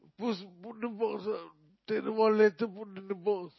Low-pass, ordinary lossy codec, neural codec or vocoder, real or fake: 7.2 kHz; MP3, 24 kbps; none; real